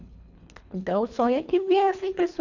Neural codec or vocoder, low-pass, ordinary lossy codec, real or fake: codec, 24 kHz, 3 kbps, HILCodec; 7.2 kHz; none; fake